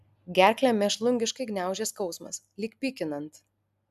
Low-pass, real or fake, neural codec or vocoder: 14.4 kHz; real; none